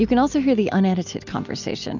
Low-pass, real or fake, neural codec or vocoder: 7.2 kHz; real; none